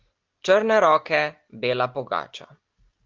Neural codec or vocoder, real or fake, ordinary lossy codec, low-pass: none; real; Opus, 32 kbps; 7.2 kHz